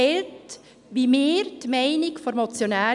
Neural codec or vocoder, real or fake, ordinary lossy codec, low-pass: none; real; none; 10.8 kHz